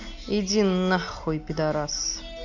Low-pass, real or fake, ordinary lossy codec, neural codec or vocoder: 7.2 kHz; real; none; none